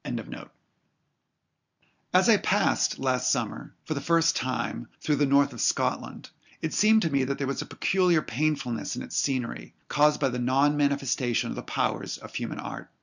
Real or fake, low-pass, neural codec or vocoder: real; 7.2 kHz; none